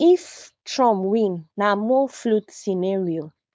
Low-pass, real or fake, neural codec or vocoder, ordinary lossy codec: none; fake; codec, 16 kHz, 4.8 kbps, FACodec; none